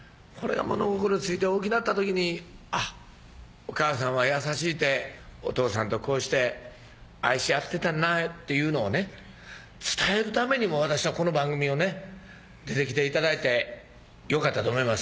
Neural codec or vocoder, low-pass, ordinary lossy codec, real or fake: none; none; none; real